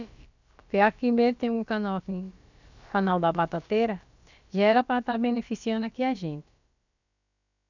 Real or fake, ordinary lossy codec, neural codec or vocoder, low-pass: fake; none; codec, 16 kHz, about 1 kbps, DyCAST, with the encoder's durations; 7.2 kHz